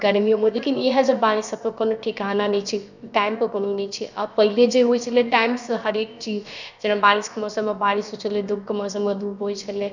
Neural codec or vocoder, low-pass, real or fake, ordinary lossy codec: codec, 16 kHz, about 1 kbps, DyCAST, with the encoder's durations; 7.2 kHz; fake; none